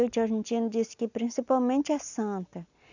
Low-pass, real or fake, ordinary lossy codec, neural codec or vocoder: 7.2 kHz; real; none; none